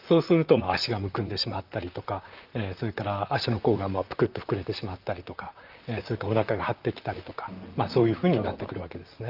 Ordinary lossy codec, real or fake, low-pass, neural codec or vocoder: Opus, 32 kbps; fake; 5.4 kHz; vocoder, 44.1 kHz, 128 mel bands, Pupu-Vocoder